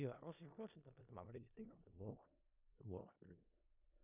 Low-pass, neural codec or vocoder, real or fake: 3.6 kHz; codec, 16 kHz in and 24 kHz out, 0.4 kbps, LongCat-Audio-Codec, four codebook decoder; fake